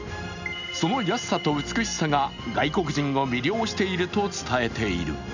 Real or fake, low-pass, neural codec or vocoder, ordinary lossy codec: real; 7.2 kHz; none; none